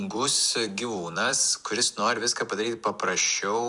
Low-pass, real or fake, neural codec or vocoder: 10.8 kHz; fake; vocoder, 44.1 kHz, 128 mel bands every 256 samples, BigVGAN v2